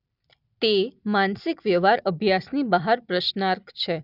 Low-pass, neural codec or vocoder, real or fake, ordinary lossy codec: 5.4 kHz; none; real; none